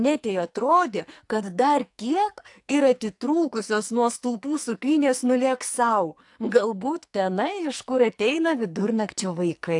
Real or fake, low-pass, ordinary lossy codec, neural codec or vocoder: fake; 10.8 kHz; AAC, 64 kbps; codec, 44.1 kHz, 2.6 kbps, SNAC